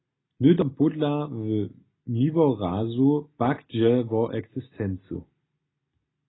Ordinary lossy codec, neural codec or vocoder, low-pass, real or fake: AAC, 16 kbps; none; 7.2 kHz; real